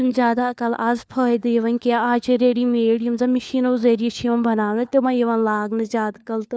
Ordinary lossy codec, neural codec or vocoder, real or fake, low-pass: none; codec, 16 kHz, 4 kbps, FunCodec, trained on LibriTTS, 50 frames a second; fake; none